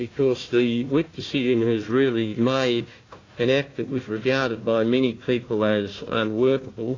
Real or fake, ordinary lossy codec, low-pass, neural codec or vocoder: fake; AAC, 32 kbps; 7.2 kHz; codec, 16 kHz, 1 kbps, FunCodec, trained on Chinese and English, 50 frames a second